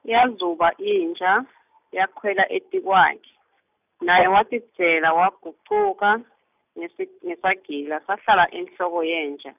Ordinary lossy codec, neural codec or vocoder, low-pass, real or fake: none; none; 3.6 kHz; real